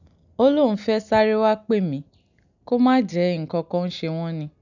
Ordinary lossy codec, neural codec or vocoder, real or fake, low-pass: none; none; real; 7.2 kHz